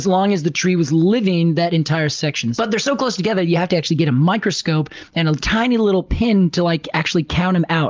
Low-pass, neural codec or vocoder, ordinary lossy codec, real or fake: 7.2 kHz; none; Opus, 32 kbps; real